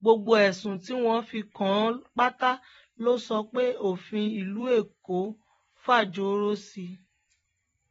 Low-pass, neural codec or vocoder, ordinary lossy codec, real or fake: 19.8 kHz; vocoder, 44.1 kHz, 128 mel bands every 256 samples, BigVGAN v2; AAC, 24 kbps; fake